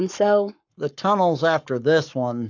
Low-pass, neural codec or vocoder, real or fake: 7.2 kHz; codec, 44.1 kHz, 7.8 kbps, Pupu-Codec; fake